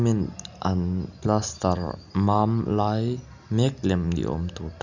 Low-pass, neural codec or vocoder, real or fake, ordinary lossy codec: 7.2 kHz; none; real; none